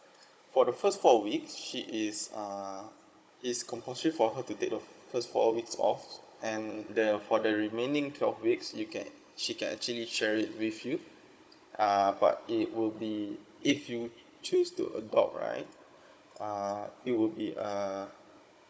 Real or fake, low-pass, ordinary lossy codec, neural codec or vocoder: fake; none; none; codec, 16 kHz, 16 kbps, FunCodec, trained on Chinese and English, 50 frames a second